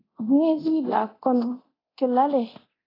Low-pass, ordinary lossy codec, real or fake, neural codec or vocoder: 5.4 kHz; AAC, 24 kbps; fake; codec, 24 kHz, 0.9 kbps, DualCodec